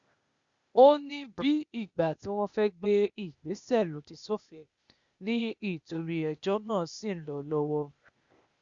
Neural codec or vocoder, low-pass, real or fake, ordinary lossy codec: codec, 16 kHz, 0.8 kbps, ZipCodec; 7.2 kHz; fake; Opus, 64 kbps